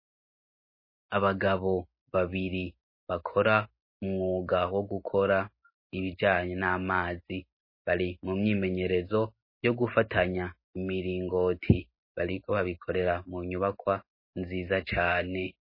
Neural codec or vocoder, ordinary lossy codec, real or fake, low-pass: none; MP3, 24 kbps; real; 5.4 kHz